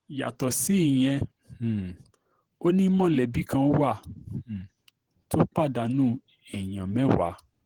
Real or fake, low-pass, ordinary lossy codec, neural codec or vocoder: fake; 19.8 kHz; Opus, 16 kbps; vocoder, 48 kHz, 128 mel bands, Vocos